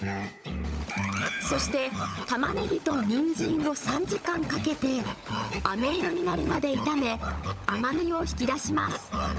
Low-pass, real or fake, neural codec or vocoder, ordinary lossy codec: none; fake; codec, 16 kHz, 16 kbps, FunCodec, trained on LibriTTS, 50 frames a second; none